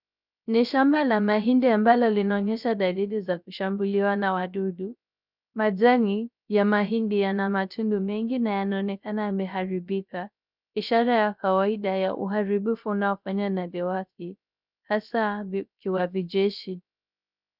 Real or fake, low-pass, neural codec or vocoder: fake; 5.4 kHz; codec, 16 kHz, 0.3 kbps, FocalCodec